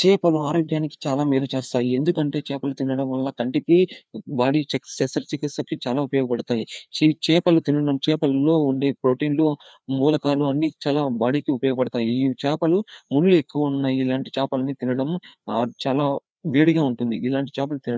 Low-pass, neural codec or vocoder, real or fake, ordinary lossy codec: none; codec, 16 kHz, 2 kbps, FreqCodec, larger model; fake; none